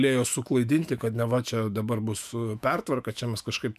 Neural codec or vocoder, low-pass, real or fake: autoencoder, 48 kHz, 128 numbers a frame, DAC-VAE, trained on Japanese speech; 14.4 kHz; fake